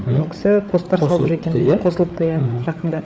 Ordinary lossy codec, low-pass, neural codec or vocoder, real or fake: none; none; codec, 16 kHz, 8 kbps, FreqCodec, larger model; fake